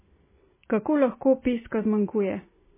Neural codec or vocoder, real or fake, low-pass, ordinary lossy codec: none; real; 3.6 kHz; MP3, 16 kbps